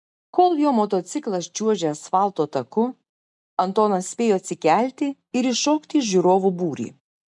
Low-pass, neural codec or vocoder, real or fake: 10.8 kHz; none; real